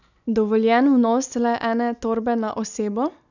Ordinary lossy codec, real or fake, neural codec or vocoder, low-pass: none; real; none; 7.2 kHz